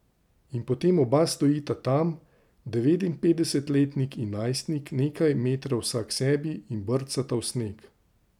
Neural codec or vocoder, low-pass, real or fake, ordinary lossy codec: none; 19.8 kHz; real; none